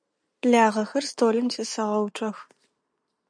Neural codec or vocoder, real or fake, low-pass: none; real; 9.9 kHz